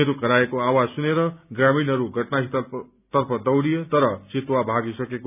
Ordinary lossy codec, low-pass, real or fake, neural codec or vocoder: none; 3.6 kHz; real; none